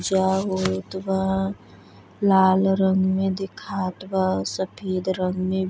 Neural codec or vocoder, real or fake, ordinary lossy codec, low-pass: none; real; none; none